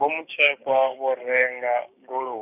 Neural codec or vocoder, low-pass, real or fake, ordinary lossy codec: codec, 16 kHz, 6 kbps, DAC; 3.6 kHz; fake; none